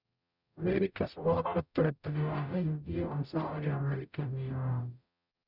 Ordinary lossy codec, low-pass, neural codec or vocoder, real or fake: none; 5.4 kHz; codec, 44.1 kHz, 0.9 kbps, DAC; fake